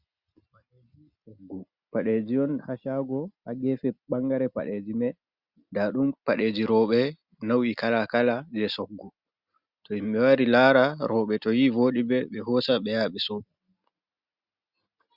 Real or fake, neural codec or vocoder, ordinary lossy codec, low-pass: real; none; Opus, 64 kbps; 5.4 kHz